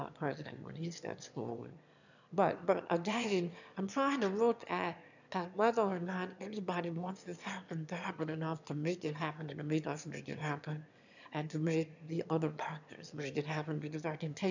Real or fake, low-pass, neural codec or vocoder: fake; 7.2 kHz; autoencoder, 22.05 kHz, a latent of 192 numbers a frame, VITS, trained on one speaker